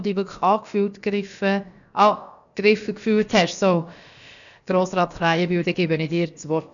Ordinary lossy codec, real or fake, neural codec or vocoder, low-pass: none; fake; codec, 16 kHz, about 1 kbps, DyCAST, with the encoder's durations; 7.2 kHz